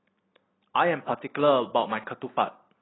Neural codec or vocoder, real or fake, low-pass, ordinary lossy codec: none; real; 7.2 kHz; AAC, 16 kbps